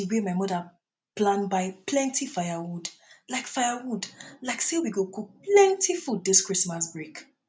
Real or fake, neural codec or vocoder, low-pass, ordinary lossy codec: real; none; none; none